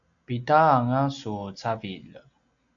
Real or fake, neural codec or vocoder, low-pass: real; none; 7.2 kHz